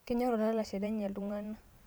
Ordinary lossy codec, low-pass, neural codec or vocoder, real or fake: none; none; vocoder, 44.1 kHz, 128 mel bands every 512 samples, BigVGAN v2; fake